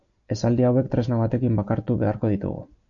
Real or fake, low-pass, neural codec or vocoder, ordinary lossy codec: real; 7.2 kHz; none; AAC, 64 kbps